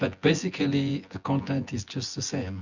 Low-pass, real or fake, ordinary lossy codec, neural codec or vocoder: 7.2 kHz; fake; Opus, 64 kbps; vocoder, 24 kHz, 100 mel bands, Vocos